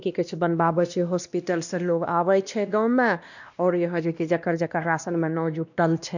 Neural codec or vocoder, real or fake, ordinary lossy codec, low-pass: codec, 16 kHz, 1 kbps, X-Codec, WavLM features, trained on Multilingual LibriSpeech; fake; none; 7.2 kHz